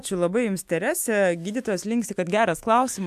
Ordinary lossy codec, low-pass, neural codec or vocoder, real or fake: AAC, 96 kbps; 14.4 kHz; autoencoder, 48 kHz, 128 numbers a frame, DAC-VAE, trained on Japanese speech; fake